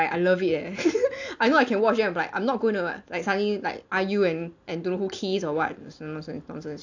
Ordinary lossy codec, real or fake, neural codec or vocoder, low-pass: AAC, 48 kbps; real; none; 7.2 kHz